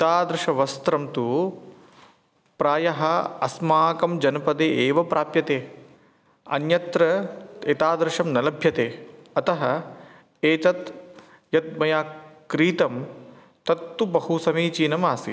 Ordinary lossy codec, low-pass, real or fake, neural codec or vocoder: none; none; real; none